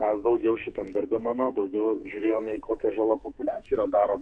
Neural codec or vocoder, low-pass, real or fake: codec, 44.1 kHz, 2.6 kbps, SNAC; 9.9 kHz; fake